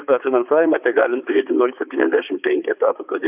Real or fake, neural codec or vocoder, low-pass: fake; codec, 16 kHz, 4 kbps, X-Codec, HuBERT features, trained on balanced general audio; 3.6 kHz